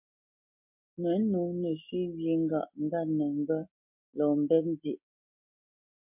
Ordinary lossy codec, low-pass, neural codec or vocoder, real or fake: MP3, 32 kbps; 3.6 kHz; none; real